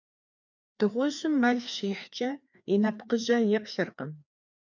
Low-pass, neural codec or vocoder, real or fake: 7.2 kHz; codec, 16 kHz, 2 kbps, FreqCodec, larger model; fake